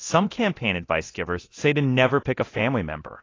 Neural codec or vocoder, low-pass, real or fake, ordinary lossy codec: codec, 24 kHz, 0.9 kbps, DualCodec; 7.2 kHz; fake; AAC, 32 kbps